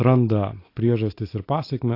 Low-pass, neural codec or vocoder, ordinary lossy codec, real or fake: 5.4 kHz; none; AAC, 32 kbps; real